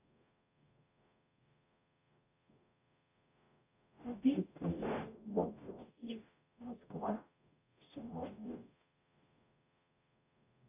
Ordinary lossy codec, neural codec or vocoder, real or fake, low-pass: none; codec, 44.1 kHz, 0.9 kbps, DAC; fake; 3.6 kHz